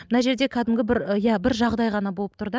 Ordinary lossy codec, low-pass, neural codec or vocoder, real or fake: none; none; none; real